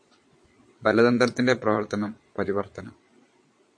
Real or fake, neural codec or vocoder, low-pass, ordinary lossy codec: fake; vocoder, 22.05 kHz, 80 mel bands, Vocos; 9.9 kHz; MP3, 48 kbps